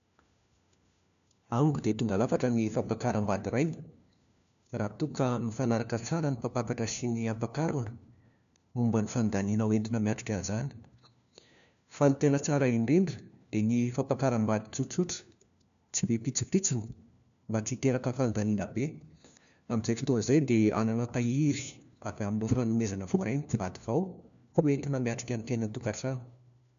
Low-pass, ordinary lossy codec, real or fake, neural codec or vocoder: 7.2 kHz; none; fake; codec, 16 kHz, 1 kbps, FunCodec, trained on LibriTTS, 50 frames a second